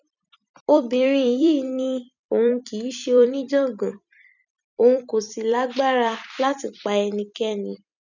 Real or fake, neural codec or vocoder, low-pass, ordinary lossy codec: fake; vocoder, 44.1 kHz, 128 mel bands every 256 samples, BigVGAN v2; 7.2 kHz; none